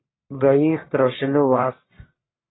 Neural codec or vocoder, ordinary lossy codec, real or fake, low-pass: codec, 44.1 kHz, 1.7 kbps, Pupu-Codec; AAC, 16 kbps; fake; 7.2 kHz